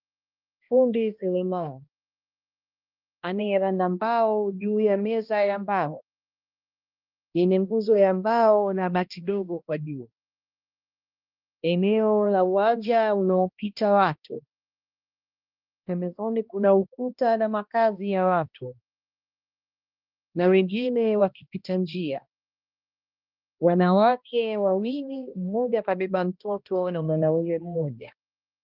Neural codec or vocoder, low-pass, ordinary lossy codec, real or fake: codec, 16 kHz, 1 kbps, X-Codec, HuBERT features, trained on balanced general audio; 5.4 kHz; Opus, 32 kbps; fake